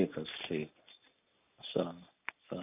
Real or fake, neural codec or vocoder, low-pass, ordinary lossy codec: real; none; 3.6 kHz; none